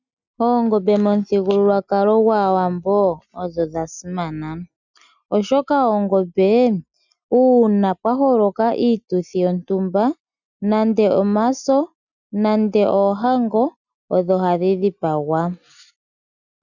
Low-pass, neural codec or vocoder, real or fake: 7.2 kHz; none; real